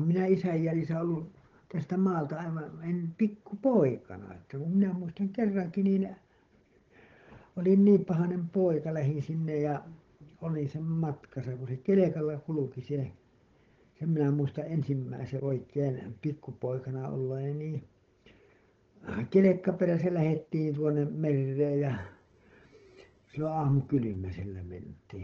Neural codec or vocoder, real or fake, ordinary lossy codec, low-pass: codec, 16 kHz, 16 kbps, FunCodec, trained on Chinese and English, 50 frames a second; fake; Opus, 16 kbps; 7.2 kHz